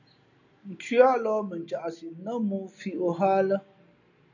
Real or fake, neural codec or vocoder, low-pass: real; none; 7.2 kHz